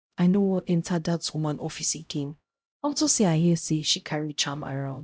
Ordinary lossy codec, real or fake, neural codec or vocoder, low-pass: none; fake; codec, 16 kHz, 0.5 kbps, X-Codec, HuBERT features, trained on LibriSpeech; none